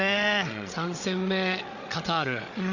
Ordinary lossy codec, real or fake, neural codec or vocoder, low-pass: none; fake; codec, 16 kHz, 8 kbps, FreqCodec, larger model; 7.2 kHz